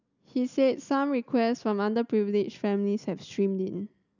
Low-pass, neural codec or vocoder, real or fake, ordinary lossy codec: 7.2 kHz; none; real; none